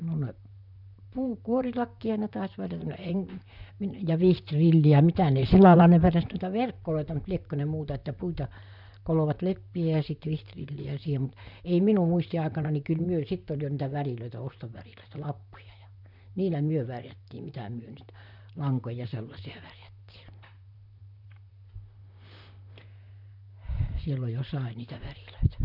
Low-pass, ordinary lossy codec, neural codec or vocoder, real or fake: 5.4 kHz; none; vocoder, 44.1 kHz, 128 mel bands, Pupu-Vocoder; fake